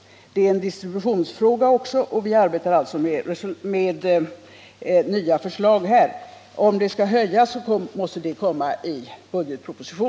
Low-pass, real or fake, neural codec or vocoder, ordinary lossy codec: none; real; none; none